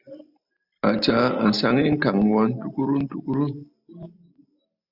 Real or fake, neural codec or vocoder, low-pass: real; none; 5.4 kHz